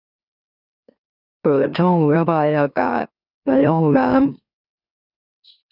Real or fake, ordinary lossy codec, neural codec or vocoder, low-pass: fake; none; autoencoder, 44.1 kHz, a latent of 192 numbers a frame, MeloTTS; 5.4 kHz